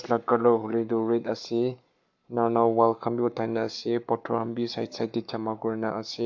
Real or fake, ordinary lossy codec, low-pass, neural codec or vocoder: fake; AAC, 48 kbps; 7.2 kHz; codec, 44.1 kHz, 7.8 kbps, Pupu-Codec